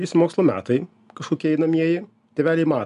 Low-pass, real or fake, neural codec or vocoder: 10.8 kHz; real; none